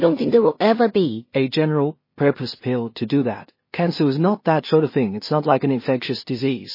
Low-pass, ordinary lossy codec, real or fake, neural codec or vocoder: 5.4 kHz; MP3, 24 kbps; fake; codec, 16 kHz in and 24 kHz out, 0.4 kbps, LongCat-Audio-Codec, two codebook decoder